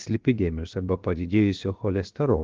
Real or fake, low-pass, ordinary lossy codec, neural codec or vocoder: fake; 7.2 kHz; Opus, 16 kbps; codec, 16 kHz, 0.7 kbps, FocalCodec